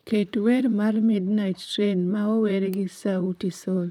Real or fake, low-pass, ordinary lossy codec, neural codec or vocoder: fake; 19.8 kHz; none; vocoder, 44.1 kHz, 128 mel bands, Pupu-Vocoder